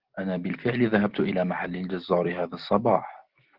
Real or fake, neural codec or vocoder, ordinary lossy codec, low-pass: real; none; Opus, 16 kbps; 5.4 kHz